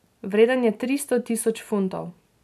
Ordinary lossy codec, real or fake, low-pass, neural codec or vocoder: none; real; 14.4 kHz; none